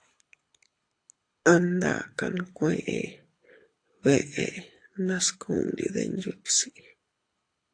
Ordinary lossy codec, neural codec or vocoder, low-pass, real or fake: AAC, 48 kbps; codec, 24 kHz, 6 kbps, HILCodec; 9.9 kHz; fake